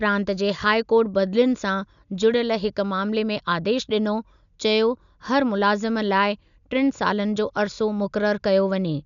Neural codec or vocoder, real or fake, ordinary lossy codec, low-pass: none; real; none; 7.2 kHz